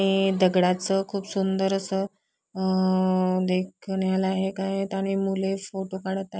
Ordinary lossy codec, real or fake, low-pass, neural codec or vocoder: none; real; none; none